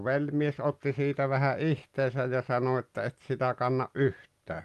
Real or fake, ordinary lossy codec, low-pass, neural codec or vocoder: real; Opus, 32 kbps; 14.4 kHz; none